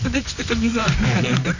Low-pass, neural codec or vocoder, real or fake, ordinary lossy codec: 7.2 kHz; codec, 24 kHz, 0.9 kbps, WavTokenizer, medium music audio release; fake; none